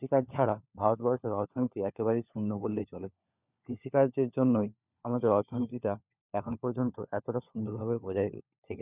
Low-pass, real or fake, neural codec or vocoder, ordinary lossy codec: 3.6 kHz; fake; codec, 16 kHz, 4 kbps, FunCodec, trained on LibriTTS, 50 frames a second; none